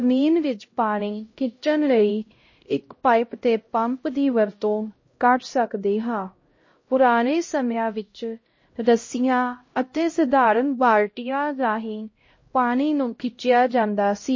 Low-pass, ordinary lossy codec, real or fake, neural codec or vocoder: 7.2 kHz; MP3, 32 kbps; fake; codec, 16 kHz, 0.5 kbps, X-Codec, HuBERT features, trained on LibriSpeech